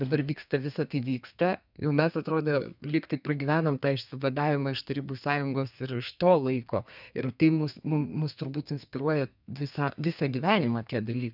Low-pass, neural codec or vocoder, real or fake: 5.4 kHz; codec, 32 kHz, 1.9 kbps, SNAC; fake